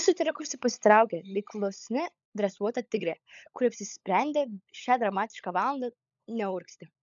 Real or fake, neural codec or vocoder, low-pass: fake; codec, 16 kHz, 16 kbps, FunCodec, trained on LibriTTS, 50 frames a second; 7.2 kHz